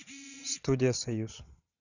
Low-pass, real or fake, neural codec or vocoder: 7.2 kHz; fake; vocoder, 22.05 kHz, 80 mel bands, WaveNeXt